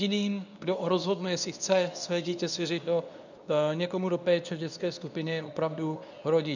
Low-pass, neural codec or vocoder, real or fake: 7.2 kHz; codec, 24 kHz, 0.9 kbps, WavTokenizer, medium speech release version 2; fake